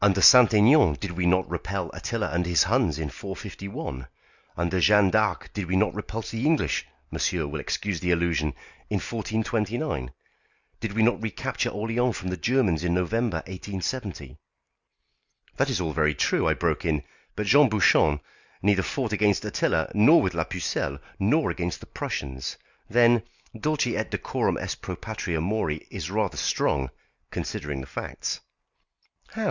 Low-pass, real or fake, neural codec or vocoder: 7.2 kHz; real; none